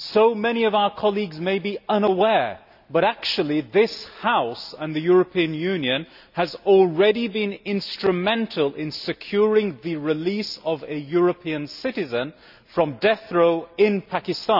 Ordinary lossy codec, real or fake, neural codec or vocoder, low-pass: none; real; none; 5.4 kHz